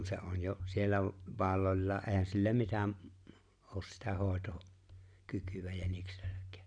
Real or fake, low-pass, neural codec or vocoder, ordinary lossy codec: real; 9.9 kHz; none; none